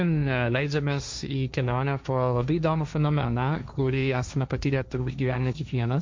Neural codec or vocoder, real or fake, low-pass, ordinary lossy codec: codec, 16 kHz, 1.1 kbps, Voila-Tokenizer; fake; 7.2 kHz; MP3, 64 kbps